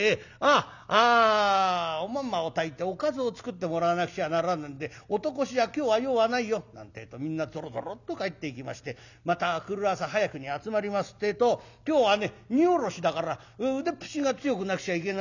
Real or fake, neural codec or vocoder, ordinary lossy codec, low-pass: real; none; none; 7.2 kHz